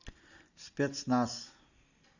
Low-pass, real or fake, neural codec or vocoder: 7.2 kHz; real; none